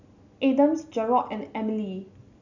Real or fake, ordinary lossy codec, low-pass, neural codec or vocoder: real; none; 7.2 kHz; none